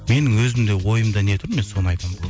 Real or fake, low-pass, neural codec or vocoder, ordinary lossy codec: real; none; none; none